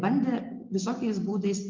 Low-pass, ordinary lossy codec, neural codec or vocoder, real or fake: 7.2 kHz; Opus, 24 kbps; none; real